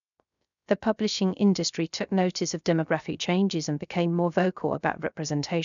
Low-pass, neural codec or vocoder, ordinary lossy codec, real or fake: 7.2 kHz; codec, 16 kHz, 0.3 kbps, FocalCodec; none; fake